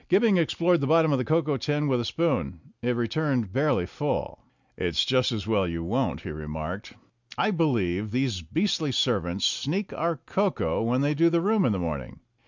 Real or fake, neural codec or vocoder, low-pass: real; none; 7.2 kHz